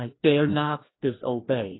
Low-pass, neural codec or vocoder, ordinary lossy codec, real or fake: 7.2 kHz; codec, 16 kHz, 1 kbps, FreqCodec, larger model; AAC, 16 kbps; fake